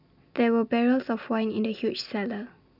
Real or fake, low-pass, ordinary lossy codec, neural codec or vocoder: real; 5.4 kHz; none; none